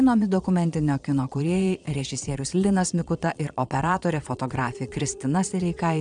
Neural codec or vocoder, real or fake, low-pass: vocoder, 22.05 kHz, 80 mel bands, Vocos; fake; 9.9 kHz